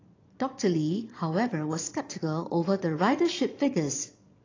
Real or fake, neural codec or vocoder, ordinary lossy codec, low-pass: fake; vocoder, 22.05 kHz, 80 mel bands, Vocos; AAC, 32 kbps; 7.2 kHz